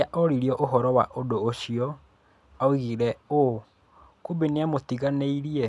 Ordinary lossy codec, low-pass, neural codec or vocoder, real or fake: none; none; none; real